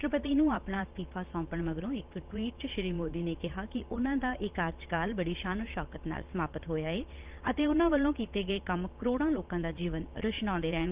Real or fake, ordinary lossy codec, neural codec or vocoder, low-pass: fake; Opus, 24 kbps; vocoder, 22.05 kHz, 80 mel bands, Vocos; 3.6 kHz